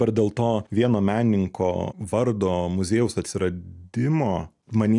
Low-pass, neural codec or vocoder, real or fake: 10.8 kHz; none; real